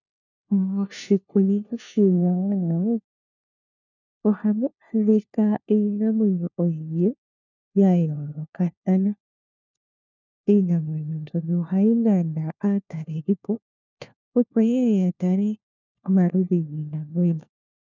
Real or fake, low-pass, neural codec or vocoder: fake; 7.2 kHz; codec, 16 kHz, 1 kbps, FunCodec, trained on LibriTTS, 50 frames a second